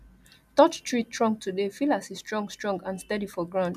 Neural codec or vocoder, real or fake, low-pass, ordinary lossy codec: none; real; 14.4 kHz; none